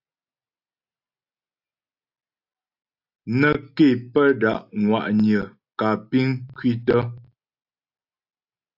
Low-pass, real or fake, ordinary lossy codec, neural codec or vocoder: 5.4 kHz; real; AAC, 48 kbps; none